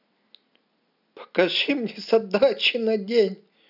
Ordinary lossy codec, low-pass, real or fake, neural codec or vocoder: none; 5.4 kHz; real; none